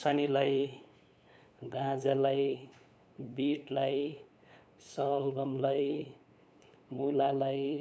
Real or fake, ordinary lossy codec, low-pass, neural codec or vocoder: fake; none; none; codec, 16 kHz, 8 kbps, FunCodec, trained on LibriTTS, 25 frames a second